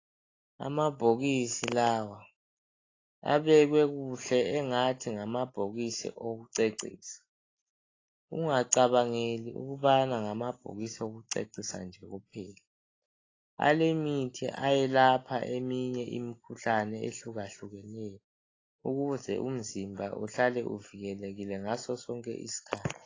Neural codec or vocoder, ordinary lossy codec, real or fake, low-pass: none; AAC, 32 kbps; real; 7.2 kHz